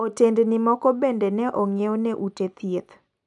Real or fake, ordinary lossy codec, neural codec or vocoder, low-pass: real; none; none; 10.8 kHz